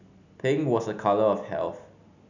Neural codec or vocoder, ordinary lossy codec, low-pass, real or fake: none; none; 7.2 kHz; real